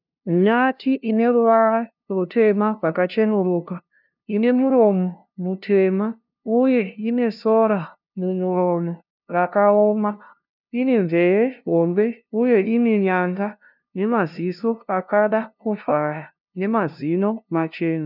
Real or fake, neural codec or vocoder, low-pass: fake; codec, 16 kHz, 0.5 kbps, FunCodec, trained on LibriTTS, 25 frames a second; 5.4 kHz